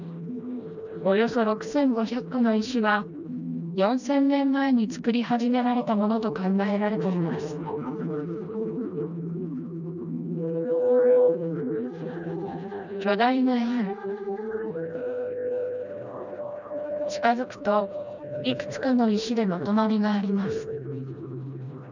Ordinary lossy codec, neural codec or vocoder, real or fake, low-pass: none; codec, 16 kHz, 1 kbps, FreqCodec, smaller model; fake; 7.2 kHz